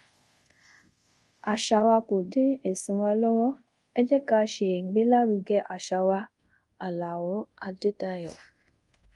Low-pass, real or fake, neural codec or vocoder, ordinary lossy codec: 10.8 kHz; fake; codec, 24 kHz, 0.5 kbps, DualCodec; Opus, 32 kbps